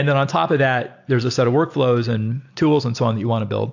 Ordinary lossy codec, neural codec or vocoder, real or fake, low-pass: AAC, 48 kbps; none; real; 7.2 kHz